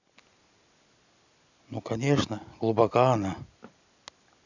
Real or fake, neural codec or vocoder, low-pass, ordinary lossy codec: real; none; 7.2 kHz; none